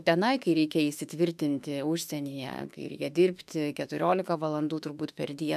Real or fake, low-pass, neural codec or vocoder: fake; 14.4 kHz; autoencoder, 48 kHz, 32 numbers a frame, DAC-VAE, trained on Japanese speech